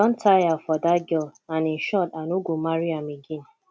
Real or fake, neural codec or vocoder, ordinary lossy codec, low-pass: real; none; none; none